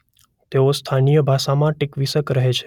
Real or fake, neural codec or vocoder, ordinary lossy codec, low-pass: real; none; none; 19.8 kHz